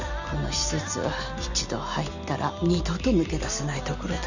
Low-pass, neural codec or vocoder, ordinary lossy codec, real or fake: 7.2 kHz; none; none; real